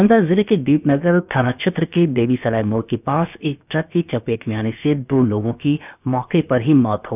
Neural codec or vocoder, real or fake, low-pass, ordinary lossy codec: codec, 16 kHz, about 1 kbps, DyCAST, with the encoder's durations; fake; 3.6 kHz; none